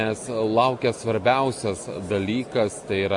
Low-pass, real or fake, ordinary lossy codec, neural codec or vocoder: 10.8 kHz; real; MP3, 48 kbps; none